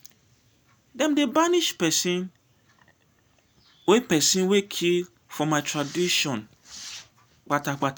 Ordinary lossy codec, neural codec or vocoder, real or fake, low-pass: none; none; real; none